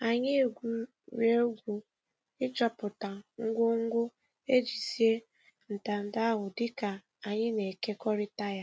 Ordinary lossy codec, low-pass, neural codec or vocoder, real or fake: none; none; none; real